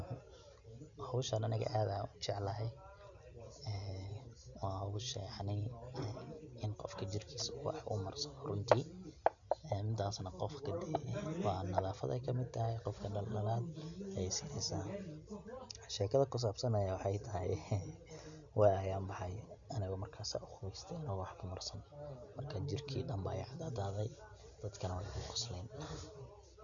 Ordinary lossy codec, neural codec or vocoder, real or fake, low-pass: none; none; real; 7.2 kHz